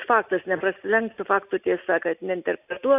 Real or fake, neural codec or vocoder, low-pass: real; none; 3.6 kHz